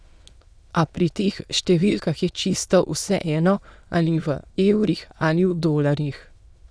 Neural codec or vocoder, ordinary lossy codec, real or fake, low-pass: autoencoder, 22.05 kHz, a latent of 192 numbers a frame, VITS, trained on many speakers; none; fake; none